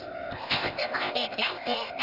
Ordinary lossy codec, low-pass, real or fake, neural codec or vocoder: none; 5.4 kHz; fake; codec, 16 kHz, 0.8 kbps, ZipCodec